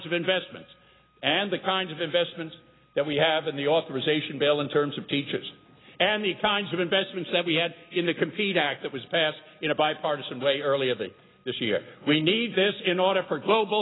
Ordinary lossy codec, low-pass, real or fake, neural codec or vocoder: AAC, 16 kbps; 7.2 kHz; real; none